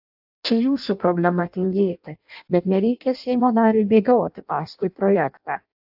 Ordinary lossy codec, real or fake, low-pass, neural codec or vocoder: AAC, 48 kbps; fake; 5.4 kHz; codec, 16 kHz in and 24 kHz out, 0.6 kbps, FireRedTTS-2 codec